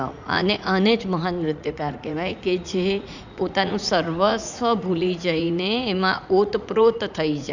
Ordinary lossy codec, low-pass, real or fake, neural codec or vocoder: none; 7.2 kHz; fake; vocoder, 44.1 kHz, 80 mel bands, Vocos